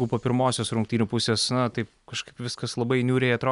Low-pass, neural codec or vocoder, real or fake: 10.8 kHz; none; real